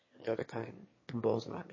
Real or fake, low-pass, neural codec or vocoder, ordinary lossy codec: fake; 7.2 kHz; autoencoder, 22.05 kHz, a latent of 192 numbers a frame, VITS, trained on one speaker; MP3, 32 kbps